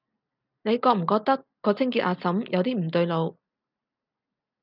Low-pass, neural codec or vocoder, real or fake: 5.4 kHz; none; real